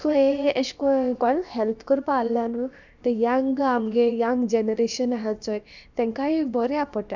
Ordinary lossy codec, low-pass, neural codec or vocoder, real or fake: none; 7.2 kHz; codec, 16 kHz, about 1 kbps, DyCAST, with the encoder's durations; fake